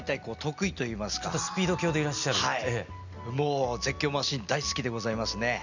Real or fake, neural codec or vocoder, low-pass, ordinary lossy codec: real; none; 7.2 kHz; none